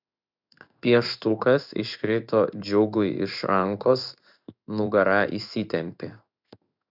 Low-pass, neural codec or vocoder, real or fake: 5.4 kHz; codec, 16 kHz in and 24 kHz out, 1 kbps, XY-Tokenizer; fake